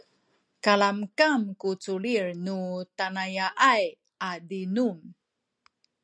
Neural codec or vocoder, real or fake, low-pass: none; real; 9.9 kHz